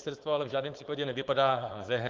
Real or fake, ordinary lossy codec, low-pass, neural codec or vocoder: fake; Opus, 32 kbps; 7.2 kHz; codec, 16 kHz, 4.8 kbps, FACodec